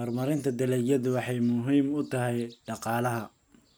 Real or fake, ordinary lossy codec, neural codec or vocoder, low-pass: real; none; none; none